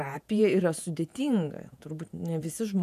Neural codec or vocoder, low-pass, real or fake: vocoder, 48 kHz, 128 mel bands, Vocos; 14.4 kHz; fake